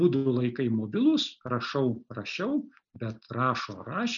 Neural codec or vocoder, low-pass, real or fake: none; 7.2 kHz; real